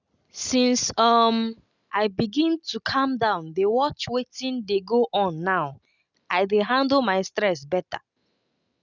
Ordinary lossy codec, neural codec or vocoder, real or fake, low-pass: none; none; real; 7.2 kHz